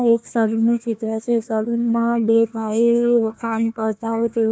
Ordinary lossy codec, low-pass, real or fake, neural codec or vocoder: none; none; fake; codec, 16 kHz, 1 kbps, FunCodec, trained on Chinese and English, 50 frames a second